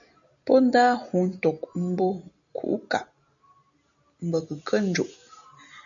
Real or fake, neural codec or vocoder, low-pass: real; none; 7.2 kHz